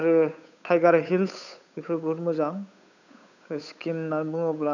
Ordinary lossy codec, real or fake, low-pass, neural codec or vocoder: none; fake; 7.2 kHz; codec, 16 kHz, 8 kbps, FunCodec, trained on Chinese and English, 25 frames a second